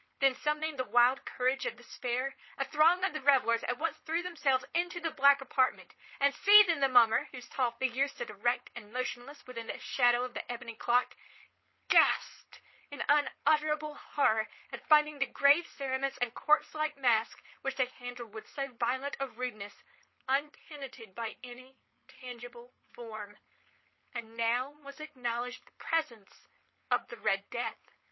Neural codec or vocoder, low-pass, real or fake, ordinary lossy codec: codec, 16 kHz, 4.8 kbps, FACodec; 7.2 kHz; fake; MP3, 24 kbps